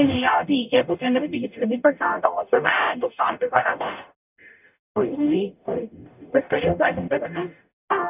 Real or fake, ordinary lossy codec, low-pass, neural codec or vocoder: fake; none; 3.6 kHz; codec, 44.1 kHz, 0.9 kbps, DAC